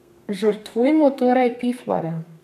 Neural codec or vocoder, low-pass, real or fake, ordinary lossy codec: codec, 32 kHz, 1.9 kbps, SNAC; 14.4 kHz; fake; none